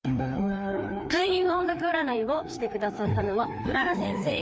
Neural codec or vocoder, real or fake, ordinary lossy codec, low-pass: codec, 16 kHz, 2 kbps, FreqCodec, larger model; fake; none; none